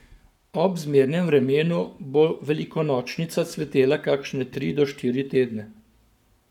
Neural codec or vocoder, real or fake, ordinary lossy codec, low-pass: codec, 44.1 kHz, 7.8 kbps, Pupu-Codec; fake; none; 19.8 kHz